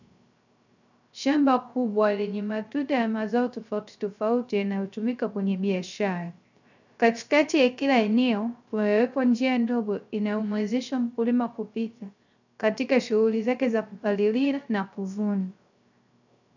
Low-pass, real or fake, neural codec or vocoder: 7.2 kHz; fake; codec, 16 kHz, 0.3 kbps, FocalCodec